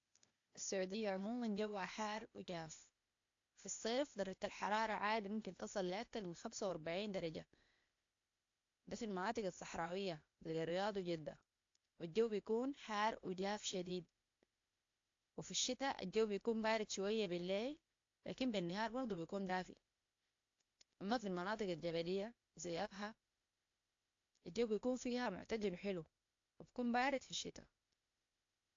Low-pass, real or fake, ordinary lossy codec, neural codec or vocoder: 7.2 kHz; fake; AAC, 48 kbps; codec, 16 kHz, 0.8 kbps, ZipCodec